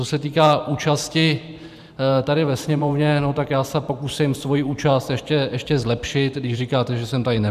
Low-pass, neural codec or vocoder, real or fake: 14.4 kHz; vocoder, 44.1 kHz, 128 mel bands every 512 samples, BigVGAN v2; fake